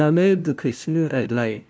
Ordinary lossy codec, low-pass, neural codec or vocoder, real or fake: none; none; codec, 16 kHz, 0.5 kbps, FunCodec, trained on LibriTTS, 25 frames a second; fake